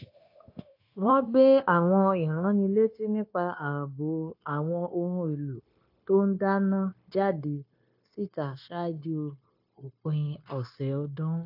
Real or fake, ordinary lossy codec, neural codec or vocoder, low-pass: fake; AAC, 48 kbps; codec, 16 kHz, 0.9 kbps, LongCat-Audio-Codec; 5.4 kHz